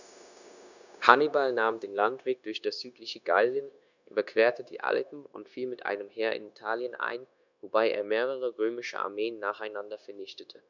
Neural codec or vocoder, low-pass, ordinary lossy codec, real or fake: codec, 16 kHz, 0.9 kbps, LongCat-Audio-Codec; 7.2 kHz; none; fake